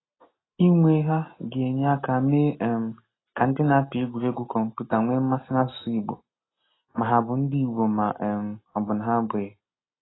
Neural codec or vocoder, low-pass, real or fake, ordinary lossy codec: none; 7.2 kHz; real; AAC, 16 kbps